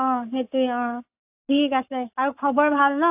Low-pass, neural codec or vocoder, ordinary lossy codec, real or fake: 3.6 kHz; codec, 44.1 kHz, 7.8 kbps, Pupu-Codec; AAC, 32 kbps; fake